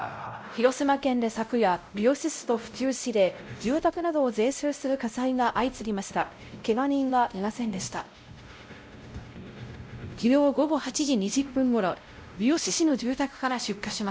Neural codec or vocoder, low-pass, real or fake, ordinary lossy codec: codec, 16 kHz, 0.5 kbps, X-Codec, WavLM features, trained on Multilingual LibriSpeech; none; fake; none